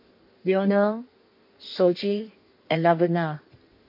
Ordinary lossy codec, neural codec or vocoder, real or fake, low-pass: MP3, 32 kbps; codec, 16 kHz in and 24 kHz out, 1.1 kbps, FireRedTTS-2 codec; fake; 5.4 kHz